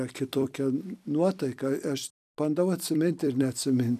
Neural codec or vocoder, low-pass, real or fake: vocoder, 44.1 kHz, 128 mel bands every 256 samples, BigVGAN v2; 14.4 kHz; fake